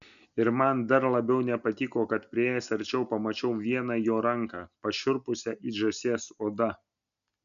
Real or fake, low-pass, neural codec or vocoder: real; 7.2 kHz; none